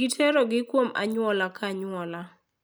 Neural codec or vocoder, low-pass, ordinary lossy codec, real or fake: none; none; none; real